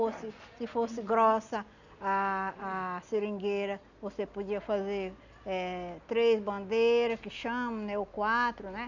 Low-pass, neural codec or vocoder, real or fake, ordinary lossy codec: 7.2 kHz; none; real; none